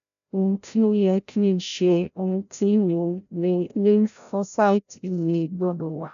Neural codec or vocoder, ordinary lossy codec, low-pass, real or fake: codec, 16 kHz, 0.5 kbps, FreqCodec, larger model; none; 7.2 kHz; fake